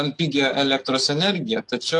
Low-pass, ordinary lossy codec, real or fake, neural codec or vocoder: 10.8 kHz; AAC, 48 kbps; fake; codec, 44.1 kHz, 7.8 kbps, DAC